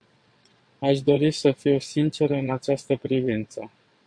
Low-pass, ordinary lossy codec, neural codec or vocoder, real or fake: 9.9 kHz; MP3, 64 kbps; vocoder, 22.05 kHz, 80 mel bands, WaveNeXt; fake